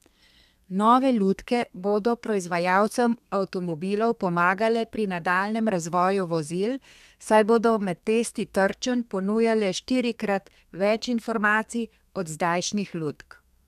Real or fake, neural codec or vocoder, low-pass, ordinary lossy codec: fake; codec, 32 kHz, 1.9 kbps, SNAC; 14.4 kHz; none